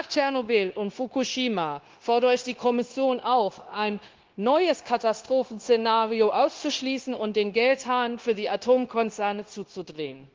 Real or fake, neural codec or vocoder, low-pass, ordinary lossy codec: fake; codec, 16 kHz, 0.9 kbps, LongCat-Audio-Codec; 7.2 kHz; Opus, 16 kbps